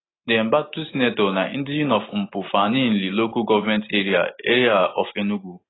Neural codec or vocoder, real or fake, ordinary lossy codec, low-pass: none; real; AAC, 16 kbps; 7.2 kHz